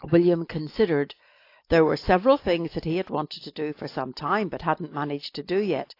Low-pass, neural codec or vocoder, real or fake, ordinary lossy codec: 5.4 kHz; none; real; AAC, 32 kbps